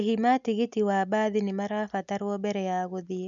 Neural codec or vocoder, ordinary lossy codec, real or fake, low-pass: none; none; real; 7.2 kHz